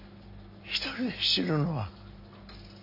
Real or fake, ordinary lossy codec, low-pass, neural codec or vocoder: real; none; 5.4 kHz; none